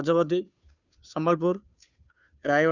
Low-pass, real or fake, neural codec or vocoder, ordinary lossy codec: 7.2 kHz; fake; codec, 16 kHz, 2 kbps, FunCodec, trained on Chinese and English, 25 frames a second; none